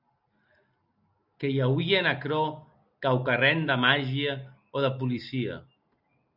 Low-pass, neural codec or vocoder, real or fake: 5.4 kHz; none; real